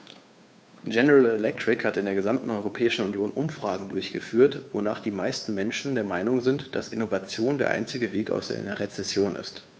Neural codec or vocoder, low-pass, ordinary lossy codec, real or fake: codec, 16 kHz, 2 kbps, FunCodec, trained on Chinese and English, 25 frames a second; none; none; fake